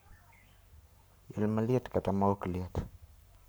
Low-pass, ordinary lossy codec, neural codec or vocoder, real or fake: none; none; codec, 44.1 kHz, 7.8 kbps, Pupu-Codec; fake